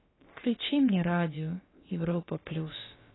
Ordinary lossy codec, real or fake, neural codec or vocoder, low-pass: AAC, 16 kbps; fake; codec, 16 kHz, about 1 kbps, DyCAST, with the encoder's durations; 7.2 kHz